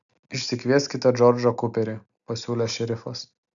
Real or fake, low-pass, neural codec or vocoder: real; 7.2 kHz; none